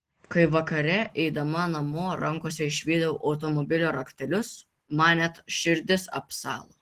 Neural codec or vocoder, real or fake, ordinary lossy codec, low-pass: none; real; Opus, 16 kbps; 14.4 kHz